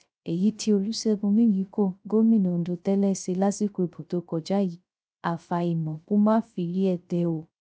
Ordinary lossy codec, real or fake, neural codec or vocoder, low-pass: none; fake; codec, 16 kHz, 0.3 kbps, FocalCodec; none